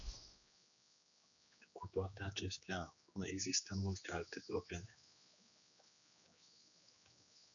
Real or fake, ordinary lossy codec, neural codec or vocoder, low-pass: fake; MP3, 96 kbps; codec, 16 kHz, 2 kbps, X-Codec, HuBERT features, trained on general audio; 7.2 kHz